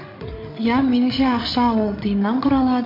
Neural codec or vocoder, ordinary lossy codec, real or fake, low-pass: codec, 16 kHz, 16 kbps, FreqCodec, larger model; MP3, 32 kbps; fake; 5.4 kHz